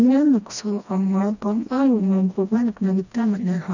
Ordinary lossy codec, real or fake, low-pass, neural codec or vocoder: none; fake; 7.2 kHz; codec, 16 kHz, 1 kbps, FreqCodec, smaller model